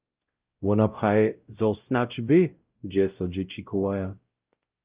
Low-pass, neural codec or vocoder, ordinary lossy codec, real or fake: 3.6 kHz; codec, 16 kHz, 0.5 kbps, X-Codec, WavLM features, trained on Multilingual LibriSpeech; Opus, 24 kbps; fake